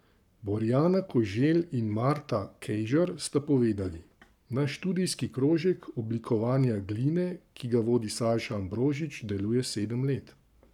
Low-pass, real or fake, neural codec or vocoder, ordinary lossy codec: 19.8 kHz; fake; codec, 44.1 kHz, 7.8 kbps, Pupu-Codec; none